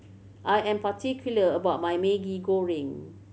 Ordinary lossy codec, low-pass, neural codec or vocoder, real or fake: none; none; none; real